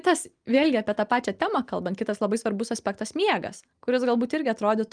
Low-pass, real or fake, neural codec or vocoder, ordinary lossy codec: 9.9 kHz; real; none; Opus, 32 kbps